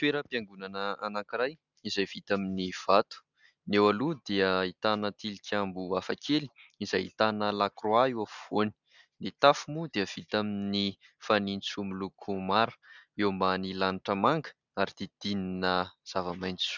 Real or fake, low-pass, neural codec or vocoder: real; 7.2 kHz; none